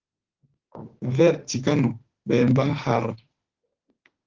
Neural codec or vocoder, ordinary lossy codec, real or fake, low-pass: autoencoder, 48 kHz, 32 numbers a frame, DAC-VAE, trained on Japanese speech; Opus, 16 kbps; fake; 7.2 kHz